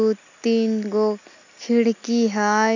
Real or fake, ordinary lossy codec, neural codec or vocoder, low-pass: real; none; none; 7.2 kHz